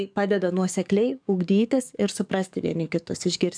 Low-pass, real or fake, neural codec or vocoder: 9.9 kHz; fake; codec, 44.1 kHz, 7.8 kbps, DAC